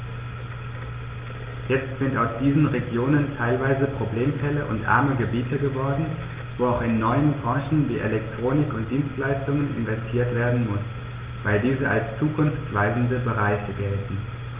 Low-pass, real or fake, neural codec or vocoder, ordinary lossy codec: 3.6 kHz; real; none; Opus, 32 kbps